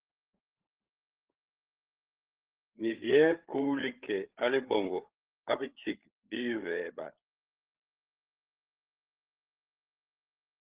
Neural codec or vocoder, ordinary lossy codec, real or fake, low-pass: codec, 16 kHz, 8 kbps, FreqCodec, larger model; Opus, 16 kbps; fake; 3.6 kHz